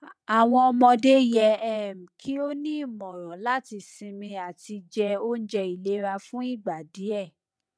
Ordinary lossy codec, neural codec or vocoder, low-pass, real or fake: none; vocoder, 22.05 kHz, 80 mel bands, WaveNeXt; none; fake